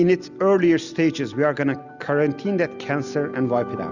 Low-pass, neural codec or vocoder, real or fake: 7.2 kHz; none; real